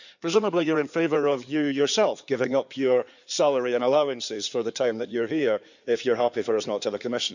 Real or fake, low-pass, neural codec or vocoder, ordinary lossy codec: fake; 7.2 kHz; codec, 16 kHz in and 24 kHz out, 2.2 kbps, FireRedTTS-2 codec; none